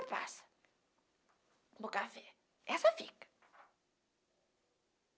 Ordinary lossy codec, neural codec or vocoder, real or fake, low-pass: none; none; real; none